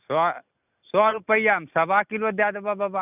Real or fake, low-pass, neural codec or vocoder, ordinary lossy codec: real; 3.6 kHz; none; none